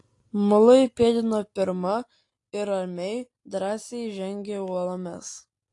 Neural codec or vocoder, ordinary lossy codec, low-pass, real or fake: none; AAC, 48 kbps; 10.8 kHz; real